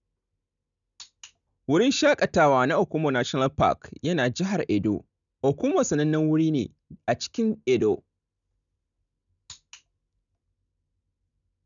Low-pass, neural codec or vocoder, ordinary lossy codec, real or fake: 7.2 kHz; none; none; real